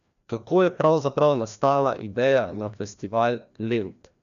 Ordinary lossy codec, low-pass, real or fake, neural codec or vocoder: none; 7.2 kHz; fake; codec, 16 kHz, 1 kbps, FreqCodec, larger model